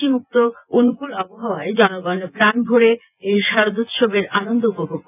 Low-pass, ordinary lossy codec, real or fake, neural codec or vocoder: 3.6 kHz; none; fake; vocoder, 24 kHz, 100 mel bands, Vocos